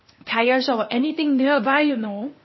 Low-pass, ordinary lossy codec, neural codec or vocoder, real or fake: 7.2 kHz; MP3, 24 kbps; codec, 16 kHz, 0.8 kbps, ZipCodec; fake